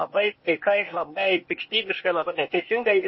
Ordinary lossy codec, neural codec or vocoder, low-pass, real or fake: MP3, 24 kbps; codec, 16 kHz, 0.8 kbps, ZipCodec; 7.2 kHz; fake